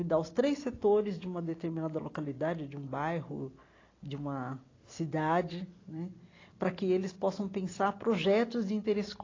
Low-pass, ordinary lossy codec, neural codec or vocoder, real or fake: 7.2 kHz; AAC, 32 kbps; none; real